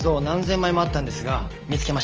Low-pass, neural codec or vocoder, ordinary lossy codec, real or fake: 7.2 kHz; none; Opus, 24 kbps; real